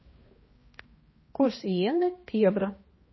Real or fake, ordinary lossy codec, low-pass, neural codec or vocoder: fake; MP3, 24 kbps; 7.2 kHz; codec, 16 kHz, 1 kbps, X-Codec, HuBERT features, trained on balanced general audio